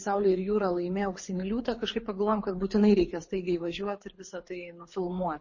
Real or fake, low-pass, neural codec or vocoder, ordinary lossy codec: fake; 7.2 kHz; codec, 24 kHz, 6 kbps, HILCodec; MP3, 32 kbps